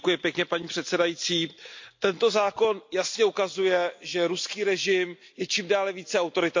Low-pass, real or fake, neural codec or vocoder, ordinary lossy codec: 7.2 kHz; real; none; MP3, 48 kbps